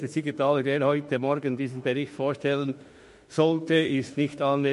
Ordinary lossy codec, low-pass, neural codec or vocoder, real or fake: MP3, 48 kbps; 14.4 kHz; autoencoder, 48 kHz, 32 numbers a frame, DAC-VAE, trained on Japanese speech; fake